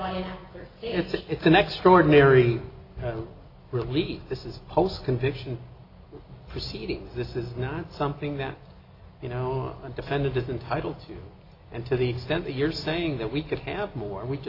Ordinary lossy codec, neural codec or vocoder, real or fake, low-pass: AAC, 32 kbps; none; real; 5.4 kHz